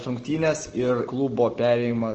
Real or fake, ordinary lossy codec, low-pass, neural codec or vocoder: real; Opus, 16 kbps; 7.2 kHz; none